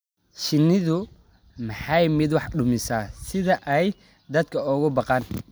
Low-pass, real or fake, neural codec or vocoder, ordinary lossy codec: none; real; none; none